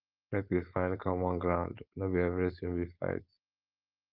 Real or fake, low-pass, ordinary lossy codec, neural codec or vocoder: fake; 5.4 kHz; Opus, 24 kbps; codec, 16 kHz, 4.8 kbps, FACodec